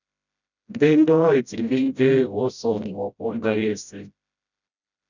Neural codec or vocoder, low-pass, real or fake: codec, 16 kHz, 0.5 kbps, FreqCodec, smaller model; 7.2 kHz; fake